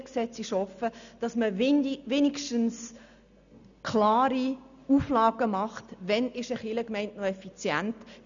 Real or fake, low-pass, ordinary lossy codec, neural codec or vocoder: real; 7.2 kHz; none; none